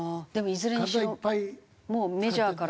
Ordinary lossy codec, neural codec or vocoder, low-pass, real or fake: none; none; none; real